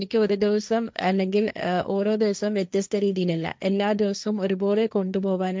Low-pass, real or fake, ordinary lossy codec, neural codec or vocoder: none; fake; none; codec, 16 kHz, 1.1 kbps, Voila-Tokenizer